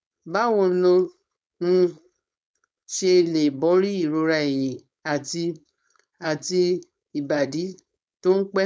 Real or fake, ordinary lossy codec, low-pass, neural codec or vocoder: fake; none; none; codec, 16 kHz, 4.8 kbps, FACodec